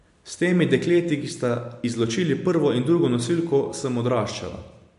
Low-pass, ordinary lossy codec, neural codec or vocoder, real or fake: 10.8 kHz; MP3, 64 kbps; none; real